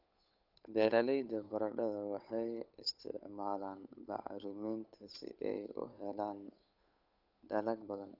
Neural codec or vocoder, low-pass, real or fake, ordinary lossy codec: codec, 16 kHz, 8 kbps, FunCodec, trained on Chinese and English, 25 frames a second; 5.4 kHz; fake; none